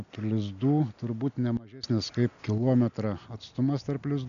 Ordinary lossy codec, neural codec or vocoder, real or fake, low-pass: MP3, 96 kbps; none; real; 7.2 kHz